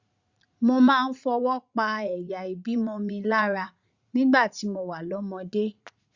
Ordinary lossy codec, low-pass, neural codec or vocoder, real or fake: Opus, 64 kbps; 7.2 kHz; vocoder, 44.1 kHz, 80 mel bands, Vocos; fake